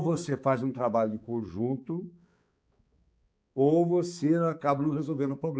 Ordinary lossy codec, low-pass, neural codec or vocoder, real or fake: none; none; codec, 16 kHz, 4 kbps, X-Codec, HuBERT features, trained on balanced general audio; fake